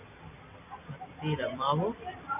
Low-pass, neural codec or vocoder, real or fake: 3.6 kHz; vocoder, 44.1 kHz, 128 mel bands every 256 samples, BigVGAN v2; fake